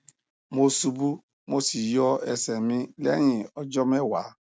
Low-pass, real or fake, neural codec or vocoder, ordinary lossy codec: none; real; none; none